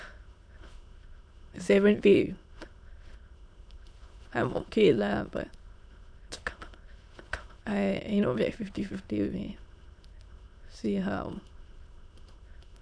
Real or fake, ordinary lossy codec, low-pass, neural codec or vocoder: fake; none; 9.9 kHz; autoencoder, 22.05 kHz, a latent of 192 numbers a frame, VITS, trained on many speakers